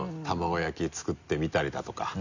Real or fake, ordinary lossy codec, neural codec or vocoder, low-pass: real; none; none; 7.2 kHz